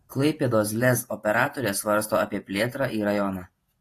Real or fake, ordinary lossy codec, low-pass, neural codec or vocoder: fake; AAC, 48 kbps; 14.4 kHz; vocoder, 48 kHz, 128 mel bands, Vocos